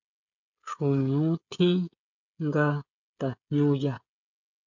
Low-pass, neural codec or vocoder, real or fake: 7.2 kHz; codec, 16 kHz, 16 kbps, FreqCodec, smaller model; fake